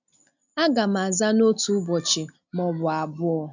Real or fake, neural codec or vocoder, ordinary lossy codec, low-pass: real; none; none; 7.2 kHz